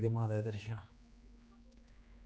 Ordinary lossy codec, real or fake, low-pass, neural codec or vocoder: none; fake; none; codec, 16 kHz, 2 kbps, X-Codec, HuBERT features, trained on general audio